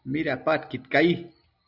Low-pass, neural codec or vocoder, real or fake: 5.4 kHz; none; real